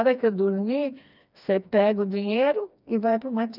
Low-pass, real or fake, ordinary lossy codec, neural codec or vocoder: 5.4 kHz; fake; none; codec, 16 kHz, 2 kbps, FreqCodec, smaller model